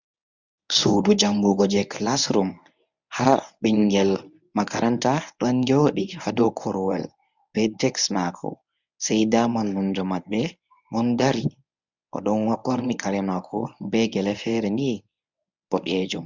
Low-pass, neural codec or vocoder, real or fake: 7.2 kHz; codec, 24 kHz, 0.9 kbps, WavTokenizer, medium speech release version 1; fake